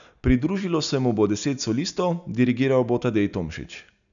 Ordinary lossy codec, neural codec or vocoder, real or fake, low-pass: none; none; real; 7.2 kHz